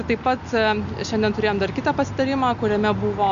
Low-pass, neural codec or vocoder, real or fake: 7.2 kHz; none; real